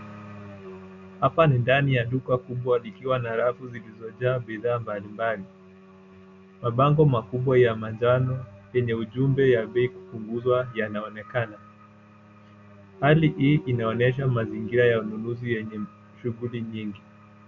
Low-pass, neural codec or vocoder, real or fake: 7.2 kHz; none; real